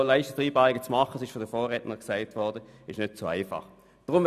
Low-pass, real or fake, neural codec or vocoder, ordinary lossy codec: 14.4 kHz; real; none; none